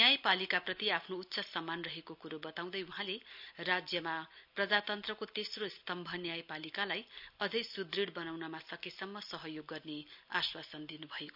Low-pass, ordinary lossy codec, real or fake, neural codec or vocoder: 5.4 kHz; none; real; none